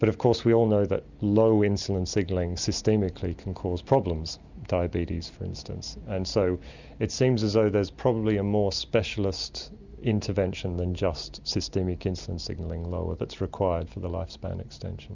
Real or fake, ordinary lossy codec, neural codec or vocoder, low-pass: real; Opus, 64 kbps; none; 7.2 kHz